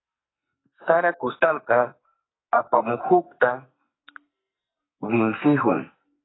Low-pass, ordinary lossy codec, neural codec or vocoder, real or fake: 7.2 kHz; AAC, 16 kbps; codec, 44.1 kHz, 2.6 kbps, SNAC; fake